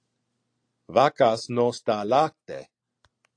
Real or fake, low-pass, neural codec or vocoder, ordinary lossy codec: real; 9.9 kHz; none; AAC, 48 kbps